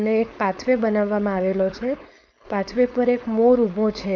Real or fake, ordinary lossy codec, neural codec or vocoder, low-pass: fake; none; codec, 16 kHz, 4.8 kbps, FACodec; none